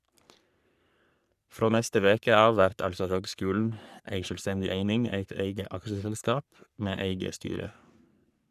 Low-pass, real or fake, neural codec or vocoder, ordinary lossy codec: 14.4 kHz; fake; codec, 44.1 kHz, 3.4 kbps, Pupu-Codec; none